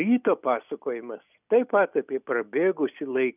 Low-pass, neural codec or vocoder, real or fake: 3.6 kHz; none; real